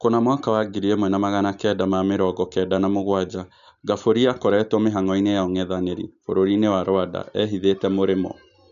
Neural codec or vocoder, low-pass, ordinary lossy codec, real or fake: none; 7.2 kHz; none; real